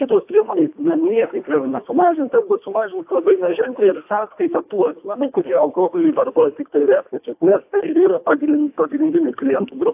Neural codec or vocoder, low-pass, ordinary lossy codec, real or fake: codec, 24 kHz, 1.5 kbps, HILCodec; 3.6 kHz; AAC, 32 kbps; fake